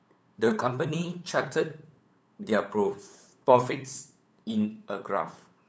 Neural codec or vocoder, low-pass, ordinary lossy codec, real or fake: codec, 16 kHz, 8 kbps, FunCodec, trained on LibriTTS, 25 frames a second; none; none; fake